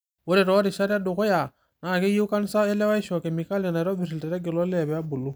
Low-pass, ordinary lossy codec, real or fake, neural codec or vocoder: none; none; real; none